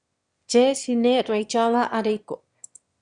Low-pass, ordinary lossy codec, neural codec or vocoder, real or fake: 9.9 kHz; Opus, 64 kbps; autoencoder, 22.05 kHz, a latent of 192 numbers a frame, VITS, trained on one speaker; fake